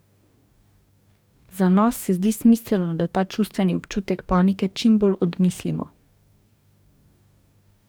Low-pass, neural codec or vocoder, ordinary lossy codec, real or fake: none; codec, 44.1 kHz, 2.6 kbps, DAC; none; fake